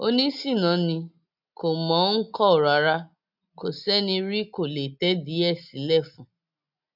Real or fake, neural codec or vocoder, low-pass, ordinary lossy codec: real; none; 5.4 kHz; none